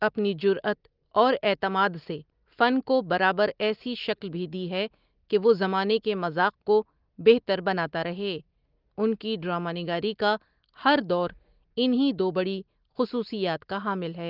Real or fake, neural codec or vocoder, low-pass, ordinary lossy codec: real; none; 5.4 kHz; Opus, 32 kbps